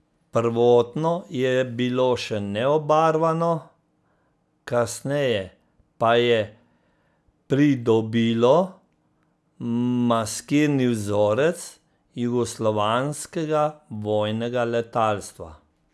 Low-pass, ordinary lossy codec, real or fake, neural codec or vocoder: none; none; real; none